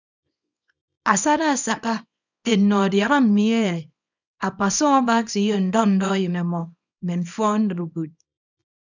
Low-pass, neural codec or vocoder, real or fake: 7.2 kHz; codec, 24 kHz, 0.9 kbps, WavTokenizer, small release; fake